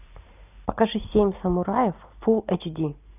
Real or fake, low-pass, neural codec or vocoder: fake; 3.6 kHz; vocoder, 44.1 kHz, 128 mel bands every 512 samples, BigVGAN v2